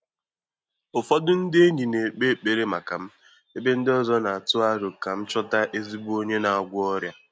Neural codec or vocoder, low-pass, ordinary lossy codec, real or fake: none; none; none; real